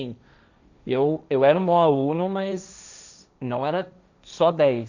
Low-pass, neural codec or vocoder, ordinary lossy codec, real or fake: 7.2 kHz; codec, 16 kHz, 1.1 kbps, Voila-Tokenizer; none; fake